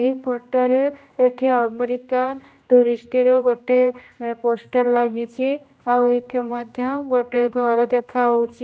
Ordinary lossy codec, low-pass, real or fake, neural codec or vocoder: none; none; fake; codec, 16 kHz, 1 kbps, X-Codec, HuBERT features, trained on general audio